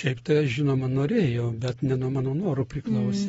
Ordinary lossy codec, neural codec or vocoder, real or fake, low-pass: AAC, 24 kbps; none; real; 19.8 kHz